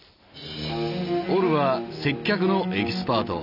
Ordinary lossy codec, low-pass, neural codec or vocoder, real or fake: none; 5.4 kHz; none; real